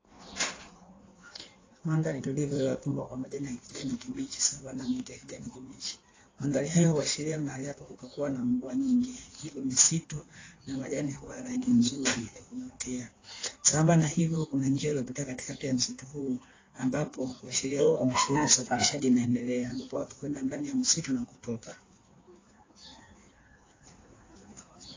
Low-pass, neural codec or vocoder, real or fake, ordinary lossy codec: 7.2 kHz; codec, 16 kHz in and 24 kHz out, 1.1 kbps, FireRedTTS-2 codec; fake; AAC, 32 kbps